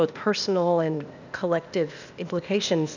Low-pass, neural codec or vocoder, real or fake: 7.2 kHz; codec, 16 kHz, 0.8 kbps, ZipCodec; fake